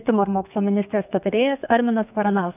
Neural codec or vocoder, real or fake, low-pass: codec, 32 kHz, 1.9 kbps, SNAC; fake; 3.6 kHz